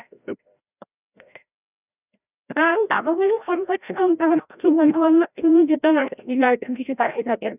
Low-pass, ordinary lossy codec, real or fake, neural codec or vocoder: 3.6 kHz; none; fake; codec, 16 kHz, 0.5 kbps, FreqCodec, larger model